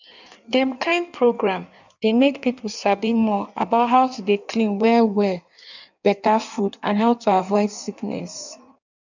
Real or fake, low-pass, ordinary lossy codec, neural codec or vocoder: fake; 7.2 kHz; none; codec, 16 kHz in and 24 kHz out, 1.1 kbps, FireRedTTS-2 codec